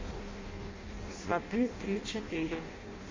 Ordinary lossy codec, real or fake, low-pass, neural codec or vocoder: MP3, 32 kbps; fake; 7.2 kHz; codec, 16 kHz in and 24 kHz out, 0.6 kbps, FireRedTTS-2 codec